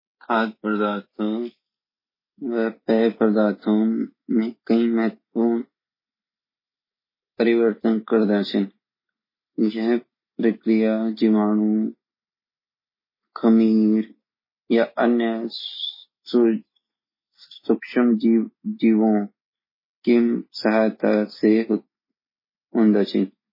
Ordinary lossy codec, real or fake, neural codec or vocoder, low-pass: MP3, 24 kbps; real; none; 5.4 kHz